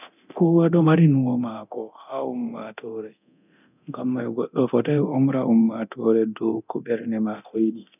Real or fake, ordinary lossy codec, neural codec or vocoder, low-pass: fake; none; codec, 24 kHz, 0.9 kbps, DualCodec; 3.6 kHz